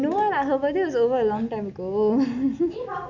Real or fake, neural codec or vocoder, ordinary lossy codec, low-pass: real; none; none; 7.2 kHz